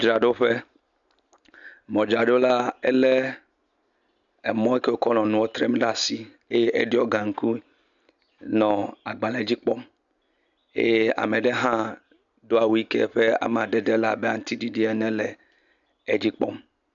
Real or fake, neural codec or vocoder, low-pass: real; none; 7.2 kHz